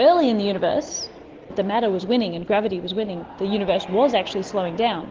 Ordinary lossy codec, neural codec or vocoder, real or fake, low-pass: Opus, 16 kbps; none; real; 7.2 kHz